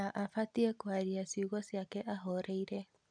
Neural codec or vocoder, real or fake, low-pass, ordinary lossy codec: none; real; 9.9 kHz; none